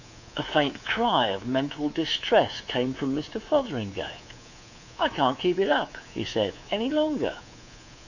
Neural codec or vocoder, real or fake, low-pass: codec, 24 kHz, 3.1 kbps, DualCodec; fake; 7.2 kHz